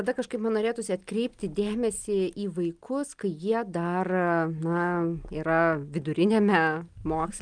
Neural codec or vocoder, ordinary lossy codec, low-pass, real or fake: none; Opus, 32 kbps; 9.9 kHz; real